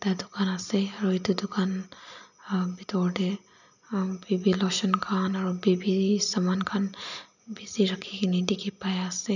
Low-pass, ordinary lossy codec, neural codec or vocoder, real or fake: 7.2 kHz; none; none; real